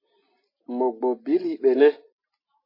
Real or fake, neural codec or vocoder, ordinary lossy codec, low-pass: real; none; MP3, 24 kbps; 5.4 kHz